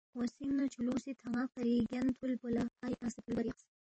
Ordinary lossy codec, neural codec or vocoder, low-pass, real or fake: MP3, 32 kbps; vocoder, 44.1 kHz, 128 mel bands every 512 samples, BigVGAN v2; 9.9 kHz; fake